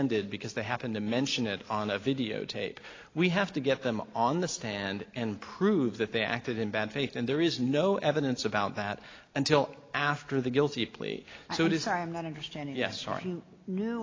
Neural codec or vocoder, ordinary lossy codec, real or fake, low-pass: none; AAC, 32 kbps; real; 7.2 kHz